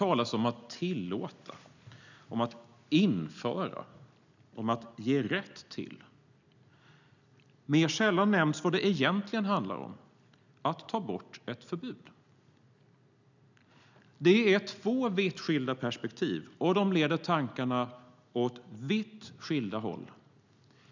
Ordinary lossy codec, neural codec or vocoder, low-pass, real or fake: none; none; 7.2 kHz; real